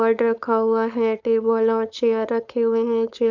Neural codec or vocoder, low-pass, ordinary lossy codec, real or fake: codec, 16 kHz, 4.8 kbps, FACodec; 7.2 kHz; none; fake